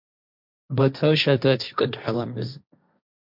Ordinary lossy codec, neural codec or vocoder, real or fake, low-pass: MP3, 48 kbps; codec, 16 kHz, 1.1 kbps, Voila-Tokenizer; fake; 5.4 kHz